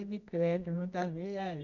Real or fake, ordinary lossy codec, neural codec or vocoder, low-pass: fake; none; codec, 24 kHz, 0.9 kbps, WavTokenizer, medium music audio release; 7.2 kHz